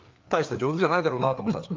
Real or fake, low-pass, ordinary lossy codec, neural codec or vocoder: fake; 7.2 kHz; Opus, 24 kbps; codec, 16 kHz, 4 kbps, FreqCodec, larger model